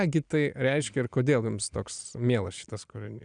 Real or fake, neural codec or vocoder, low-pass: real; none; 9.9 kHz